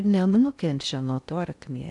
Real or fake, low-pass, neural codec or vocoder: fake; 10.8 kHz; codec, 16 kHz in and 24 kHz out, 0.8 kbps, FocalCodec, streaming, 65536 codes